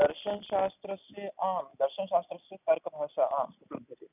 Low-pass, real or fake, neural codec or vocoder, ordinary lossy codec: 3.6 kHz; real; none; none